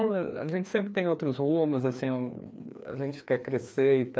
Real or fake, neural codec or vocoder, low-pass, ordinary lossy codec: fake; codec, 16 kHz, 2 kbps, FreqCodec, larger model; none; none